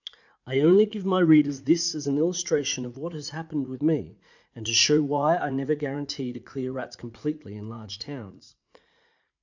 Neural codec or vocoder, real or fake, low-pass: vocoder, 44.1 kHz, 80 mel bands, Vocos; fake; 7.2 kHz